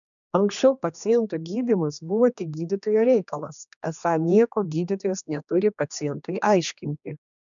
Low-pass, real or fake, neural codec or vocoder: 7.2 kHz; fake; codec, 16 kHz, 2 kbps, X-Codec, HuBERT features, trained on general audio